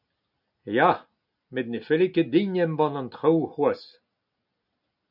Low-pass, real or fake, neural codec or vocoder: 5.4 kHz; real; none